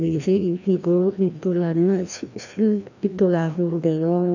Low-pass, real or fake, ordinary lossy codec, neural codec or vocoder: 7.2 kHz; fake; none; codec, 16 kHz, 1 kbps, FreqCodec, larger model